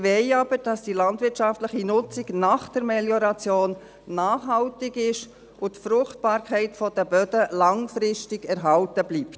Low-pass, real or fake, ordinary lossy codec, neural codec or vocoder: none; real; none; none